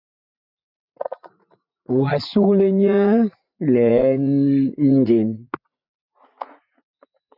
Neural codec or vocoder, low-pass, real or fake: vocoder, 44.1 kHz, 80 mel bands, Vocos; 5.4 kHz; fake